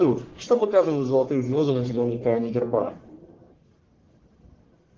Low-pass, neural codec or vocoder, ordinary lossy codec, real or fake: 7.2 kHz; codec, 44.1 kHz, 1.7 kbps, Pupu-Codec; Opus, 16 kbps; fake